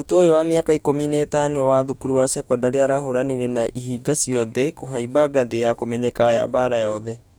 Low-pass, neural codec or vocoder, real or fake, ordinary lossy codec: none; codec, 44.1 kHz, 2.6 kbps, DAC; fake; none